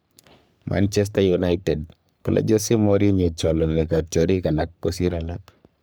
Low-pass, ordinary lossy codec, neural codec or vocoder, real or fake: none; none; codec, 44.1 kHz, 3.4 kbps, Pupu-Codec; fake